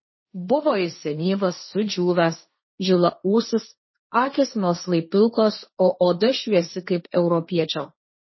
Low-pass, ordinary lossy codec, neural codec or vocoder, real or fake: 7.2 kHz; MP3, 24 kbps; codec, 16 kHz, 1.1 kbps, Voila-Tokenizer; fake